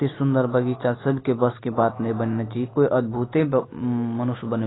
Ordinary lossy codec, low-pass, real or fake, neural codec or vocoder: AAC, 16 kbps; 7.2 kHz; real; none